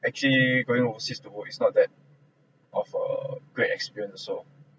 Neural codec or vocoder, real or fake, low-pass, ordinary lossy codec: none; real; none; none